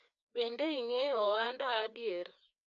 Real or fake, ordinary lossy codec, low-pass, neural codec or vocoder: fake; none; 7.2 kHz; codec, 16 kHz, 2 kbps, FreqCodec, larger model